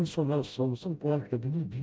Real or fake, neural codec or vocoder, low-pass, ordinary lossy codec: fake; codec, 16 kHz, 0.5 kbps, FreqCodec, smaller model; none; none